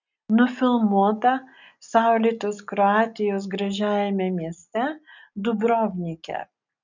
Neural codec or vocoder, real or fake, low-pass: none; real; 7.2 kHz